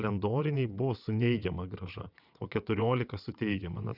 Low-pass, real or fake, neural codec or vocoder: 5.4 kHz; fake; vocoder, 22.05 kHz, 80 mel bands, WaveNeXt